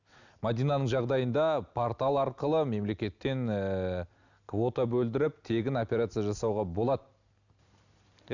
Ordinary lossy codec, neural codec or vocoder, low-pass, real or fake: none; none; 7.2 kHz; real